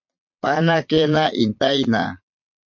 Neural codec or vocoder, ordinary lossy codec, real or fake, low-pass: vocoder, 22.05 kHz, 80 mel bands, Vocos; MP3, 48 kbps; fake; 7.2 kHz